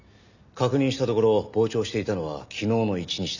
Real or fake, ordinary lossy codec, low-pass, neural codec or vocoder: real; none; 7.2 kHz; none